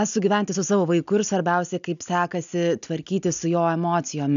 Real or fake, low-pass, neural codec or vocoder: real; 7.2 kHz; none